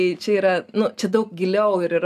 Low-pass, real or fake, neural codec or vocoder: 14.4 kHz; real; none